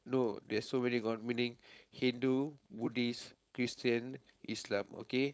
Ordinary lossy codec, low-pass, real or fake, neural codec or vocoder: none; none; fake; codec, 16 kHz, 4.8 kbps, FACodec